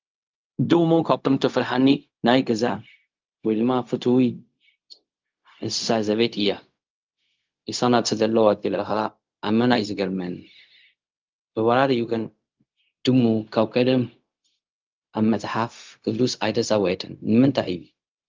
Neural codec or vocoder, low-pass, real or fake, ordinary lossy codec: codec, 16 kHz, 0.4 kbps, LongCat-Audio-Codec; 7.2 kHz; fake; Opus, 24 kbps